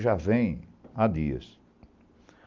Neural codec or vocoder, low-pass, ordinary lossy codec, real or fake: none; 7.2 kHz; Opus, 24 kbps; real